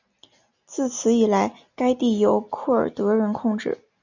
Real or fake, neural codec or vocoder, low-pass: real; none; 7.2 kHz